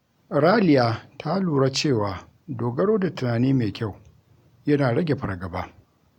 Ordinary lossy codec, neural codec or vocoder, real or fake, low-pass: MP3, 96 kbps; none; real; 19.8 kHz